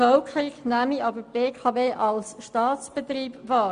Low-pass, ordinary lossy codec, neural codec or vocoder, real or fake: 9.9 kHz; MP3, 64 kbps; none; real